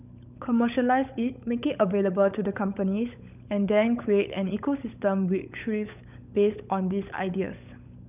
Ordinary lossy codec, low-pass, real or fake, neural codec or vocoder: none; 3.6 kHz; fake; codec, 16 kHz, 16 kbps, FunCodec, trained on LibriTTS, 50 frames a second